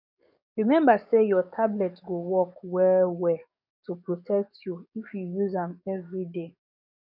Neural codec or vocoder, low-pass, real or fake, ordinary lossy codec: codec, 44.1 kHz, 7.8 kbps, DAC; 5.4 kHz; fake; none